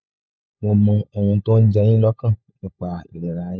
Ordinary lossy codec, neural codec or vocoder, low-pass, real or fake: none; codec, 16 kHz, 16 kbps, FunCodec, trained on LibriTTS, 50 frames a second; none; fake